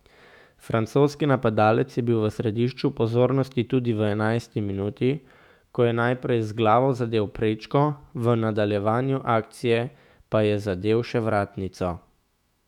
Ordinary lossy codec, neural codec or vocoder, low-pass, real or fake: none; autoencoder, 48 kHz, 128 numbers a frame, DAC-VAE, trained on Japanese speech; 19.8 kHz; fake